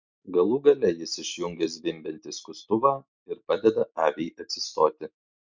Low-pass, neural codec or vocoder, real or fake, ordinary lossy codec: 7.2 kHz; none; real; MP3, 64 kbps